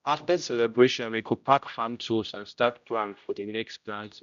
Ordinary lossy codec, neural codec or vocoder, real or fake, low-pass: none; codec, 16 kHz, 0.5 kbps, X-Codec, HuBERT features, trained on general audio; fake; 7.2 kHz